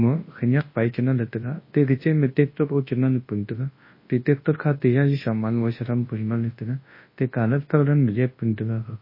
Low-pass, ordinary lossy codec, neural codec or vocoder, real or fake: 5.4 kHz; MP3, 24 kbps; codec, 24 kHz, 0.9 kbps, WavTokenizer, large speech release; fake